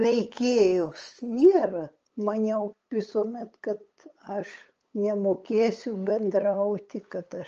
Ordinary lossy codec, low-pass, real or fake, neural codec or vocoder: Opus, 24 kbps; 7.2 kHz; fake; codec, 16 kHz, 8 kbps, FunCodec, trained on LibriTTS, 25 frames a second